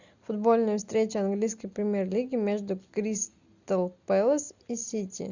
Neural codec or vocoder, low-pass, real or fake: none; 7.2 kHz; real